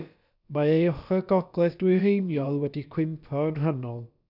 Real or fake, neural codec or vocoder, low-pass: fake; codec, 16 kHz, about 1 kbps, DyCAST, with the encoder's durations; 5.4 kHz